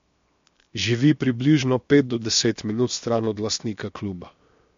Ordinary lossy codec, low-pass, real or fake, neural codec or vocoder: MP3, 48 kbps; 7.2 kHz; fake; codec, 16 kHz, 0.7 kbps, FocalCodec